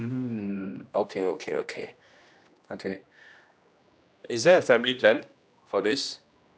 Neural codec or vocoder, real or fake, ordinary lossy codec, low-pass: codec, 16 kHz, 1 kbps, X-Codec, HuBERT features, trained on general audio; fake; none; none